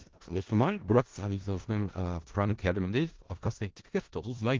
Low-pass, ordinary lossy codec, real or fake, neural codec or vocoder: 7.2 kHz; Opus, 16 kbps; fake; codec, 16 kHz in and 24 kHz out, 0.4 kbps, LongCat-Audio-Codec, four codebook decoder